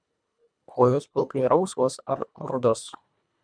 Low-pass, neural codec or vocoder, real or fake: 9.9 kHz; codec, 24 kHz, 1.5 kbps, HILCodec; fake